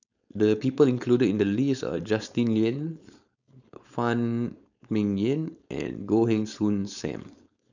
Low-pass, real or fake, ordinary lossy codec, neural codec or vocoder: 7.2 kHz; fake; none; codec, 16 kHz, 4.8 kbps, FACodec